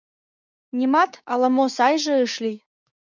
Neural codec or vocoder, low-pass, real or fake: codec, 16 kHz, 6 kbps, DAC; 7.2 kHz; fake